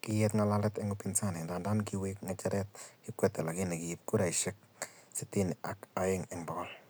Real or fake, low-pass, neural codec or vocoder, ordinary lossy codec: real; none; none; none